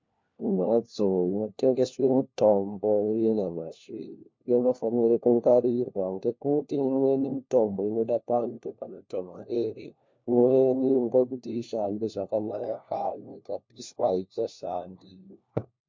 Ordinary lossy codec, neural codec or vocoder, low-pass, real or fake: MP3, 48 kbps; codec, 16 kHz, 1 kbps, FunCodec, trained on LibriTTS, 50 frames a second; 7.2 kHz; fake